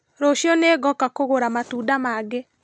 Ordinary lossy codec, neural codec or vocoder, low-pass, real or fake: none; none; none; real